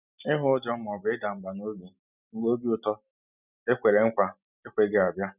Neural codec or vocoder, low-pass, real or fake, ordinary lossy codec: none; 3.6 kHz; real; none